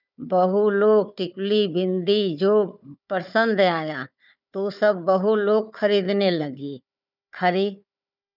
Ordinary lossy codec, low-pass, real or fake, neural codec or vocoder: none; 5.4 kHz; fake; codec, 16 kHz, 4 kbps, FunCodec, trained on Chinese and English, 50 frames a second